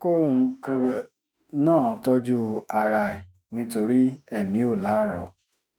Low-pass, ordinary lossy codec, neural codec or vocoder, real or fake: none; none; autoencoder, 48 kHz, 32 numbers a frame, DAC-VAE, trained on Japanese speech; fake